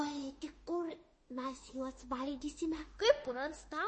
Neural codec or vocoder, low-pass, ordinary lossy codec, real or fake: autoencoder, 48 kHz, 32 numbers a frame, DAC-VAE, trained on Japanese speech; 10.8 kHz; MP3, 32 kbps; fake